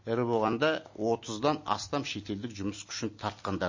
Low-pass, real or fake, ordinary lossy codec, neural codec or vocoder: 7.2 kHz; real; MP3, 32 kbps; none